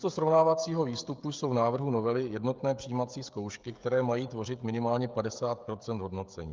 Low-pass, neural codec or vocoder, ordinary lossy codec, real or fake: 7.2 kHz; codec, 16 kHz, 16 kbps, FreqCodec, smaller model; Opus, 24 kbps; fake